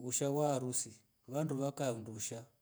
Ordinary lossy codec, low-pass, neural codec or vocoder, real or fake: none; none; vocoder, 48 kHz, 128 mel bands, Vocos; fake